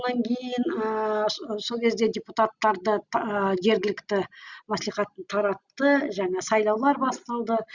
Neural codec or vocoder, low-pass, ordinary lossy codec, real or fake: none; 7.2 kHz; Opus, 64 kbps; real